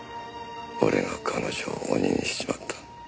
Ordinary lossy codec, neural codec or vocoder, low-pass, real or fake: none; none; none; real